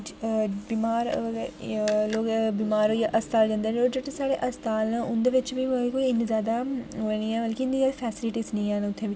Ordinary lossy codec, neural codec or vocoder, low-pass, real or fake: none; none; none; real